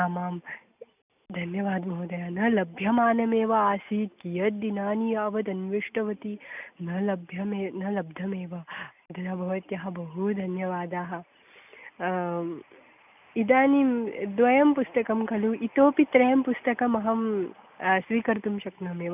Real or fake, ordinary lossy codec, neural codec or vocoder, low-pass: real; none; none; 3.6 kHz